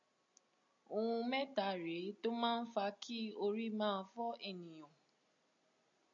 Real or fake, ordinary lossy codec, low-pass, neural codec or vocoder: real; MP3, 48 kbps; 7.2 kHz; none